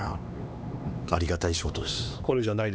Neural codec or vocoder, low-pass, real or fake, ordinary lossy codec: codec, 16 kHz, 2 kbps, X-Codec, HuBERT features, trained on LibriSpeech; none; fake; none